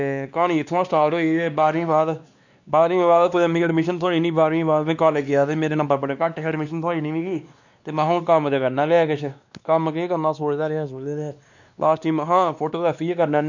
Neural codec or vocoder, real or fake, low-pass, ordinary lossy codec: codec, 16 kHz, 2 kbps, X-Codec, WavLM features, trained on Multilingual LibriSpeech; fake; 7.2 kHz; none